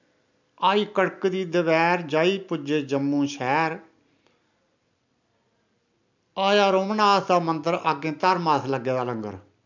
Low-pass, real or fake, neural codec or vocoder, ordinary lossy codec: 7.2 kHz; real; none; MP3, 64 kbps